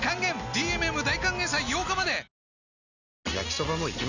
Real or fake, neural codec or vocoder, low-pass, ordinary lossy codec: real; none; 7.2 kHz; none